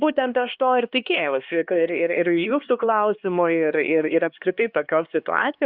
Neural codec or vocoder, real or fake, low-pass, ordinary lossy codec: codec, 16 kHz, 2 kbps, X-Codec, HuBERT features, trained on LibriSpeech; fake; 5.4 kHz; Opus, 64 kbps